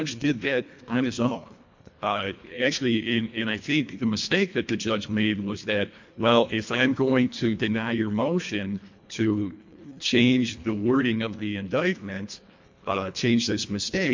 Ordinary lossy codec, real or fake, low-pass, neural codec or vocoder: MP3, 48 kbps; fake; 7.2 kHz; codec, 24 kHz, 1.5 kbps, HILCodec